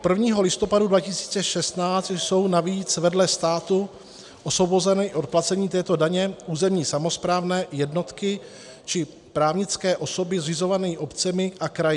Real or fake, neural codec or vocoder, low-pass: real; none; 10.8 kHz